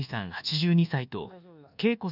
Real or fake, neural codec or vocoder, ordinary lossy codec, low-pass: fake; codec, 24 kHz, 1.2 kbps, DualCodec; none; 5.4 kHz